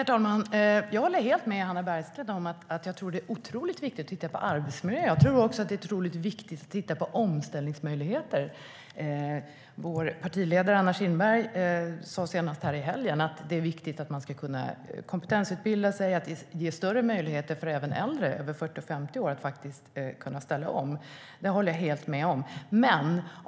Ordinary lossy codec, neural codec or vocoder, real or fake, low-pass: none; none; real; none